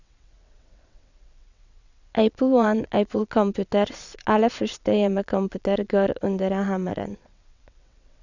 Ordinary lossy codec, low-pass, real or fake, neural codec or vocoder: none; 7.2 kHz; fake; vocoder, 22.05 kHz, 80 mel bands, WaveNeXt